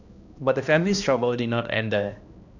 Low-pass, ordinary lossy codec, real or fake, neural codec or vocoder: 7.2 kHz; none; fake; codec, 16 kHz, 1 kbps, X-Codec, HuBERT features, trained on balanced general audio